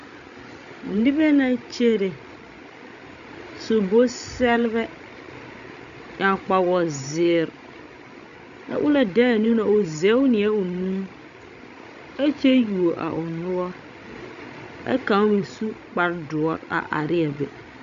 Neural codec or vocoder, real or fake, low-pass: codec, 16 kHz, 16 kbps, FreqCodec, larger model; fake; 7.2 kHz